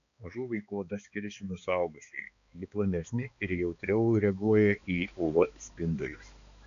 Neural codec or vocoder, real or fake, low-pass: codec, 16 kHz, 2 kbps, X-Codec, HuBERT features, trained on balanced general audio; fake; 7.2 kHz